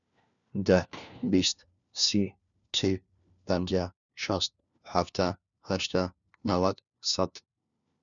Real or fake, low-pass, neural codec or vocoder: fake; 7.2 kHz; codec, 16 kHz, 1 kbps, FunCodec, trained on LibriTTS, 50 frames a second